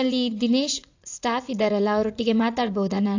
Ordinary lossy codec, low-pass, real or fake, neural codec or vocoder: AAC, 48 kbps; 7.2 kHz; fake; vocoder, 44.1 kHz, 80 mel bands, Vocos